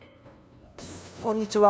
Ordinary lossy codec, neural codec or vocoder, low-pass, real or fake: none; codec, 16 kHz, 0.5 kbps, FunCodec, trained on LibriTTS, 25 frames a second; none; fake